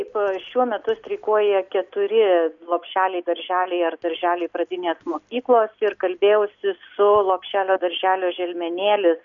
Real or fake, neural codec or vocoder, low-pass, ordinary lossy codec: real; none; 7.2 kHz; MP3, 96 kbps